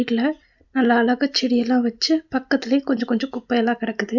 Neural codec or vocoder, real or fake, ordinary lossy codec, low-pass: none; real; none; 7.2 kHz